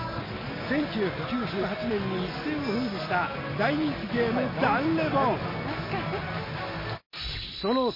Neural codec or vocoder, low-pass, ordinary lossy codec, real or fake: none; 5.4 kHz; MP3, 24 kbps; real